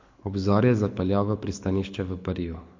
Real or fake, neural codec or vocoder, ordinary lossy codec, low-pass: fake; codec, 24 kHz, 6 kbps, HILCodec; MP3, 48 kbps; 7.2 kHz